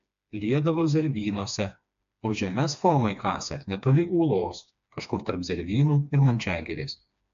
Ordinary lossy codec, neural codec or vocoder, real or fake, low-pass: AAC, 64 kbps; codec, 16 kHz, 2 kbps, FreqCodec, smaller model; fake; 7.2 kHz